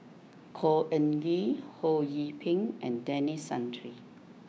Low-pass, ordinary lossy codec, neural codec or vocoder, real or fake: none; none; codec, 16 kHz, 6 kbps, DAC; fake